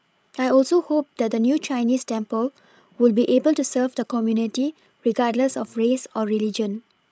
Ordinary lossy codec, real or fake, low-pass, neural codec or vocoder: none; fake; none; codec, 16 kHz, 16 kbps, FreqCodec, larger model